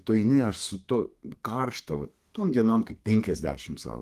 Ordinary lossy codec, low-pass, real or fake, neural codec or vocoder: Opus, 24 kbps; 14.4 kHz; fake; codec, 32 kHz, 1.9 kbps, SNAC